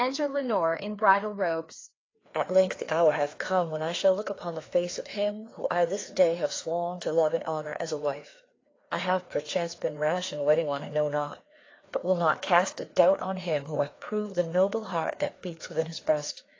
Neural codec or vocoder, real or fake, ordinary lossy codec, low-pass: codec, 16 kHz, 2 kbps, FreqCodec, larger model; fake; AAC, 32 kbps; 7.2 kHz